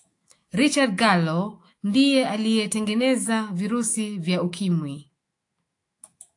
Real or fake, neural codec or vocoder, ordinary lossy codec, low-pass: fake; autoencoder, 48 kHz, 128 numbers a frame, DAC-VAE, trained on Japanese speech; AAC, 48 kbps; 10.8 kHz